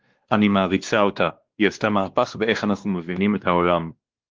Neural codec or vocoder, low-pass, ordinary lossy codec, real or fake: codec, 16 kHz, 2 kbps, X-Codec, WavLM features, trained on Multilingual LibriSpeech; 7.2 kHz; Opus, 16 kbps; fake